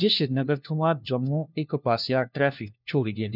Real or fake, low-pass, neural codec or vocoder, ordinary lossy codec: fake; 5.4 kHz; codec, 16 kHz, 1 kbps, FunCodec, trained on LibriTTS, 50 frames a second; none